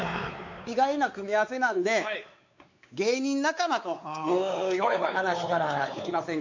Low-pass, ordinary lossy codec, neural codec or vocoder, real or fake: 7.2 kHz; MP3, 64 kbps; codec, 16 kHz, 4 kbps, X-Codec, WavLM features, trained on Multilingual LibriSpeech; fake